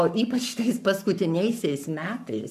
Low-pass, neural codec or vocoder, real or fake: 14.4 kHz; codec, 44.1 kHz, 7.8 kbps, Pupu-Codec; fake